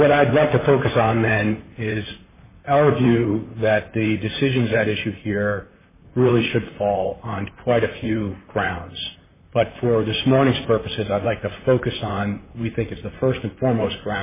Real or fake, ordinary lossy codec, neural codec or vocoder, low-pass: fake; MP3, 16 kbps; vocoder, 44.1 kHz, 128 mel bands, Pupu-Vocoder; 3.6 kHz